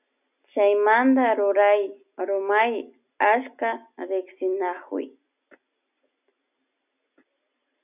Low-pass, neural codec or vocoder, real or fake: 3.6 kHz; none; real